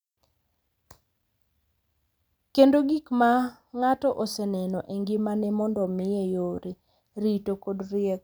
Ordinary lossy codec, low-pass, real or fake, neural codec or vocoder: none; none; real; none